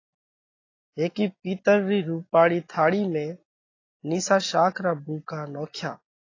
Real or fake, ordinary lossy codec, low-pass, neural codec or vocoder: real; AAC, 32 kbps; 7.2 kHz; none